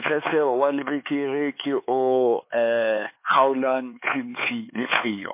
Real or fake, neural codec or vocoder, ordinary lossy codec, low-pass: fake; codec, 16 kHz, 4 kbps, X-Codec, HuBERT features, trained on LibriSpeech; MP3, 24 kbps; 3.6 kHz